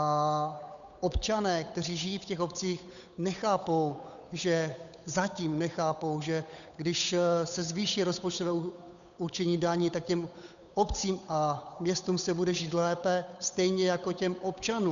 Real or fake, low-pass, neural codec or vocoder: fake; 7.2 kHz; codec, 16 kHz, 8 kbps, FunCodec, trained on Chinese and English, 25 frames a second